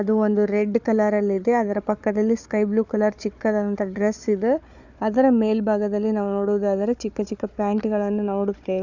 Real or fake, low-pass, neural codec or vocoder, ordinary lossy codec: fake; 7.2 kHz; codec, 16 kHz, 4 kbps, FunCodec, trained on Chinese and English, 50 frames a second; none